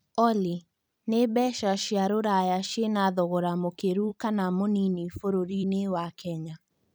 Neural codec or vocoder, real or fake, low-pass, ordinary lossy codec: vocoder, 44.1 kHz, 128 mel bands every 256 samples, BigVGAN v2; fake; none; none